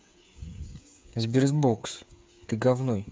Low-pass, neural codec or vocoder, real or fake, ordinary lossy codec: none; codec, 16 kHz, 8 kbps, FreqCodec, smaller model; fake; none